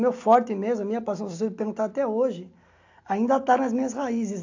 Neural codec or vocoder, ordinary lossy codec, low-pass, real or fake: none; AAC, 48 kbps; 7.2 kHz; real